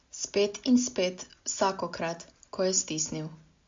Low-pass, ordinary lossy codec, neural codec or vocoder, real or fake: 7.2 kHz; MP3, 48 kbps; none; real